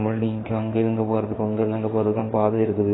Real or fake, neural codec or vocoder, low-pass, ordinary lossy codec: fake; codec, 16 kHz in and 24 kHz out, 2.2 kbps, FireRedTTS-2 codec; 7.2 kHz; AAC, 16 kbps